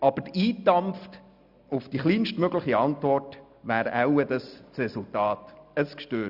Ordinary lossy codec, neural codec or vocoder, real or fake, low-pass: none; none; real; 5.4 kHz